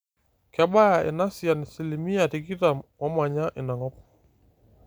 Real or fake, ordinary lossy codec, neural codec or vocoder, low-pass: real; none; none; none